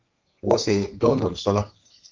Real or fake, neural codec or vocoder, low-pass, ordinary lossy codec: fake; codec, 32 kHz, 1.9 kbps, SNAC; 7.2 kHz; Opus, 24 kbps